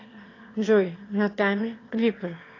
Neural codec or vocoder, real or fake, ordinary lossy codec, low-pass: autoencoder, 22.05 kHz, a latent of 192 numbers a frame, VITS, trained on one speaker; fake; AAC, 32 kbps; 7.2 kHz